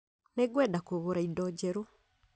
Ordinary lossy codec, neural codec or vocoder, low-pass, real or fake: none; none; none; real